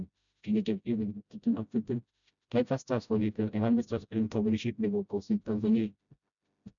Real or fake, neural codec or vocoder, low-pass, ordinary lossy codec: fake; codec, 16 kHz, 0.5 kbps, FreqCodec, smaller model; 7.2 kHz; none